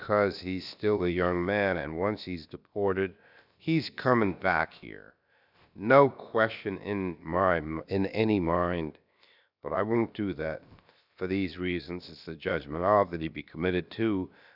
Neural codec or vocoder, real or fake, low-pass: codec, 16 kHz, about 1 kbps, DyCAST, with the encoder's durations; fake; 5.4 kHz